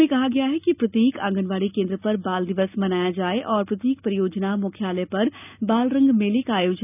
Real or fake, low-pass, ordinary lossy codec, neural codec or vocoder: real; 3.6 kHz; none; none